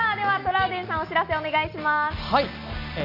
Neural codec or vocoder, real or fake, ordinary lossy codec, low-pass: none; real; none; 5.4 kHz